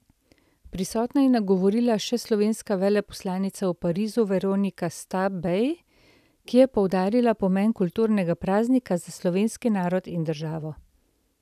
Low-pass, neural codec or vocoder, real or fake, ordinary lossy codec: 14.4 kHz; none; real; none